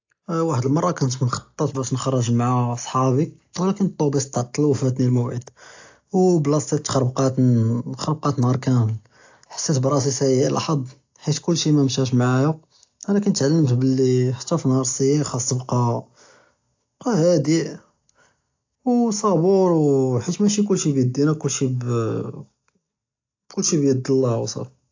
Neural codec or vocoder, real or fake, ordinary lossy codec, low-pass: none; real; AAC, 48 kbps; 7.2 kHz